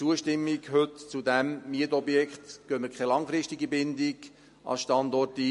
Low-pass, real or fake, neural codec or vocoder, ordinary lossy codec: 14.4 kHz; real; none; MP3, 48 kbps